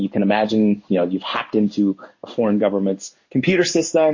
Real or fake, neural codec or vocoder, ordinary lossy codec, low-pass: fake; codec, 16 kHz in and 24 kHz out, 1 kbps, XY-Tokenizer; MP3, 32 kbps; 7.2 kHz